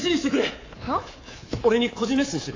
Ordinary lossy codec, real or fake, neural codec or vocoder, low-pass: AAC, 32 kbps; fake; codec, 24 kHz, 3.1 kbps, DualCodec; 7.2 kHz